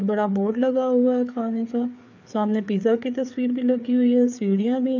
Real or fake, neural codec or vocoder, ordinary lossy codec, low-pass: fake; codec, 16 kHz, 4 kbps, FreqCodec, larger model; none; 7.2 kHz